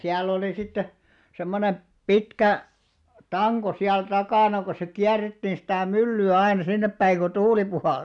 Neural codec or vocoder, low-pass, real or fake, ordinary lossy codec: none; 10.8 kHz; real; none